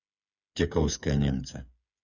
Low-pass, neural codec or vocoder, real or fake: 7.2 kHz; codec, 16 kHz, 8 kbps, FreqCodec, smaller model; fake